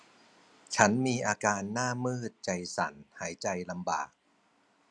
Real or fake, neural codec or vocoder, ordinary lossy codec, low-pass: real; none; none; none